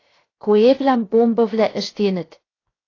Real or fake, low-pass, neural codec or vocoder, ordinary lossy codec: fake; 7.2 kHz; codec, 16 kHz, 0.7 kbps, FocalCodec; AAC, 32 kbps